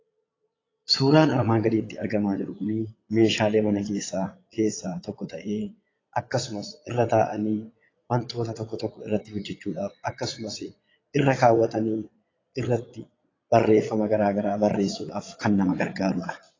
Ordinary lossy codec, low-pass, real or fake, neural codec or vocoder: AAC, 32 kbps; 7.2 kHz; fake; vocoder, 22.05 kHz, 80 mel bands, Vocos